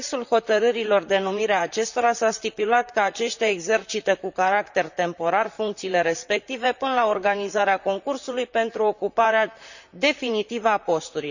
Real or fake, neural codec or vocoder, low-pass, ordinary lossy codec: fake; vocoder, 44.1 kHz, 128 mel bands, Pupu-Vocoder; 7.2 kHz; none